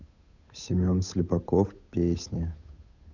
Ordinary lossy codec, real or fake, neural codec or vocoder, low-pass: none; fake; codec, 16 kHz, 8 kbps, FunCodec, trained on Chinese and English, 25 frames a second; 7.2 kHz